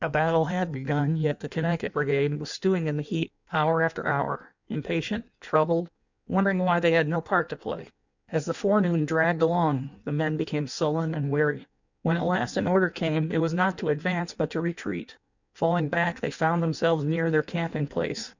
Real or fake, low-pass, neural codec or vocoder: fake; 7.2 kHz; codec, 16 kHz in and 24 kHz out, 1.1 kbps, FireRedTTS-2 codec